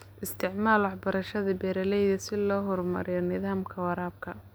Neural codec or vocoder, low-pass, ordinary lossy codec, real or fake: none; none; none; real